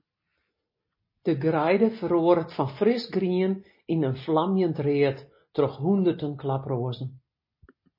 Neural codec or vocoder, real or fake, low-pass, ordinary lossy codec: none; real; 5.4 kHz; MP3, 24 kbps